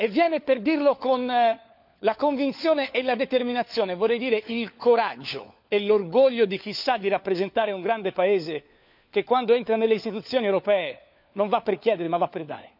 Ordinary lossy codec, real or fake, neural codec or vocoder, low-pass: none; fake; codec, 16 kHz, 4 kbps, FunCodec, trained on LibriTTS, 50 frames a second; 5.4 kHz